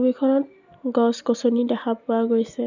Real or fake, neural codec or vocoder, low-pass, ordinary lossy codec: real; none; 7.2 kHz; none